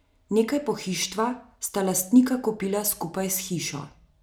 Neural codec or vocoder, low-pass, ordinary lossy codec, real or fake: none; none; none; real